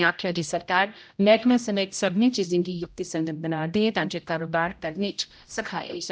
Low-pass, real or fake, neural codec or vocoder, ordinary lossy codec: none; fake; codec, 16 kHz, 0.5 kbps, X-Codec, HuBERT features, trained on general audio; none